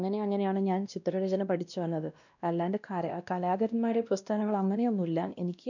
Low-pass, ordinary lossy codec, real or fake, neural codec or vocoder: 7.2 kHz; none; fake; codec, 16 kHz, 1 kbps, X-Codec, WavLM features, trained on Multilingual LibriSpeech